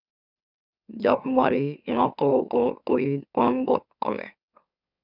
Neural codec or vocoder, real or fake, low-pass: autoencoder, 44.1 kHz, a latent of 192 numbers a frame, MeloTTS; fake; 5.4 kHz